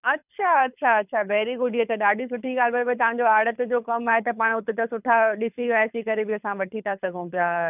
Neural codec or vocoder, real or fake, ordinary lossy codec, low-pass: codec, 24 kHz, 6 kbps, HILCodec; fake; none; 3.6 kHz